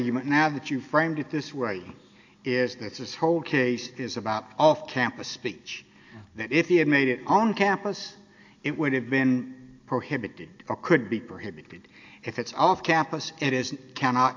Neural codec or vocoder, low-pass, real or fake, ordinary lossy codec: none; 7.2 kHz; real; AAC, 48 kbps